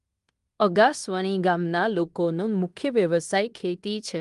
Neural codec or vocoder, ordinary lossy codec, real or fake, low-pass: codec, 16 kHz in and 24 kHz out, 0.9 kbps, LongCat-Audio-Codec, fine tuned four codebook decoder; Opus, 32 kbps; fake; 10.8 kHz